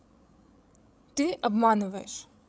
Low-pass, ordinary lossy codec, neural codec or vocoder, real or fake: none; none; codec, 16 kHz, 16 kbps, FreqCodec, larger model; fake